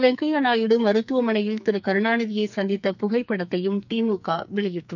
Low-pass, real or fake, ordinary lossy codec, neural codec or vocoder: 7.2 kHz; fake; none; codec, 44.1 kHz, 2.6 kbps, SNAC